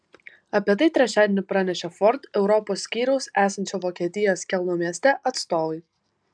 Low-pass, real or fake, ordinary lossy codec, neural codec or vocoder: 9.9 kHz; real; MP3, 96 kbps; none